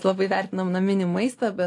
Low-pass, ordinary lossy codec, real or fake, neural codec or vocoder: 10.8 kHz; AAC, 48 kbps; real; none